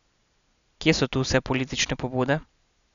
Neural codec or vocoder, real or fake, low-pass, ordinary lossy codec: none; real; 7.2 kHz; none